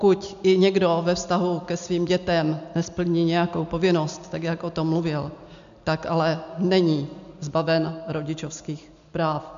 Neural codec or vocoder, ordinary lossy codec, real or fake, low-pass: none; MP3, 64 kbps; real; 7.2 kHz